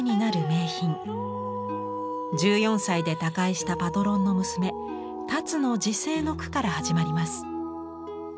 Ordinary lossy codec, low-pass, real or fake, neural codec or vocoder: none; none; real; none